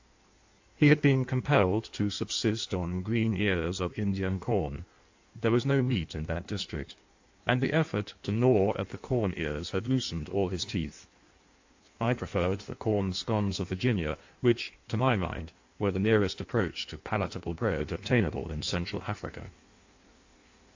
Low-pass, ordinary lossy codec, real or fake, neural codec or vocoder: 7.2 kHz; AAC, 48 kbps; fake; codec, 16 kHz in and 24 kHz out, 1.1 kbps, FireRedTTS-2 codec